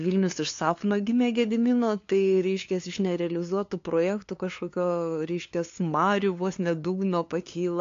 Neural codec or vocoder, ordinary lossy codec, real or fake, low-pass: codec, 16 kHz, 2 kbps, FunCodec, trained on LibriTTS, 25 frames a second; AAC, 48 kbps; fake; 7.2 kHz